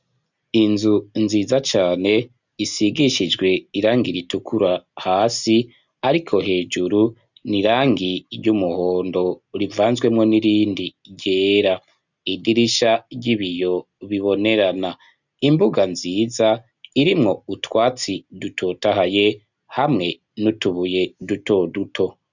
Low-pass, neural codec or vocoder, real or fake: 7.2 kHz; none; real